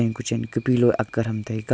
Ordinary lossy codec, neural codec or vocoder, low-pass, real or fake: none; none; none; real